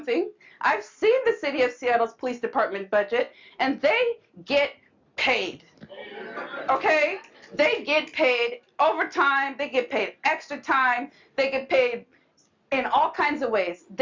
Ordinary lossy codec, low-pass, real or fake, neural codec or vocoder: MP3, 64 kbps; 7.2 kHz; real; none